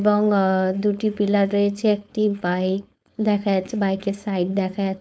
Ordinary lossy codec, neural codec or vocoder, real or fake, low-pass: none; codec, 16 kHz, 4.8 kbps, FACodec; fake; none